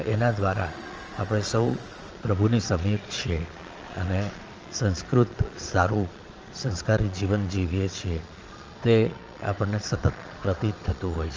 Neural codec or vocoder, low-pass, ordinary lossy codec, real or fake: vocoder, 22.05 kHz, 80 mel bands, Vocos; 7.2 kHz; Opus, 24 kbps; fake